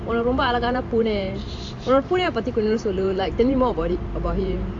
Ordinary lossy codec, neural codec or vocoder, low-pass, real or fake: AAC, 64 kbps; vocoder, 44.1 kHz, 128 mel bands every 512 samples, BigVGAN v2; 9.9 kHz; fake